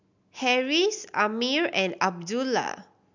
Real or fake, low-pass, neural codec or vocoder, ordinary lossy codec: real; 7.2 kHz; none; none